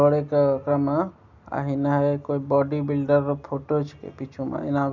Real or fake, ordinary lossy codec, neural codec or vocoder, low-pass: real; none; none; 7.2 kHz